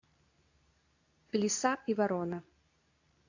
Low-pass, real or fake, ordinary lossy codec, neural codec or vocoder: 7.2 kHz; fake; AAC, 48 kbps; codec, 24 kHz, 0.9 kbps, WavTokenizer, medium speech release version 2